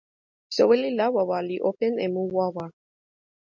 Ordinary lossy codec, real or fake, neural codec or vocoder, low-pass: MP3, 48 kbps; real; none; 7.2 kHz